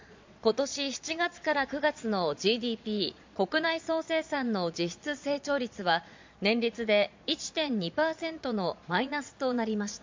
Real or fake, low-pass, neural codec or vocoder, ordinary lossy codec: fake; 7.2 kHz; vocoder, 22.05 kHz, 80 mel bands, Vocos; MP3, 64 kbps